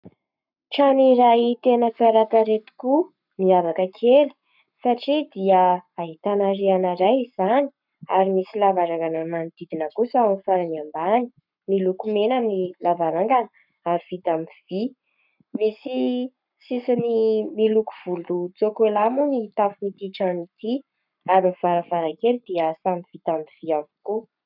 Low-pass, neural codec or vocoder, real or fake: 5.4 kHz; codec, 44.1 kHz, 7.8 kbps, Pupu-Codec; fake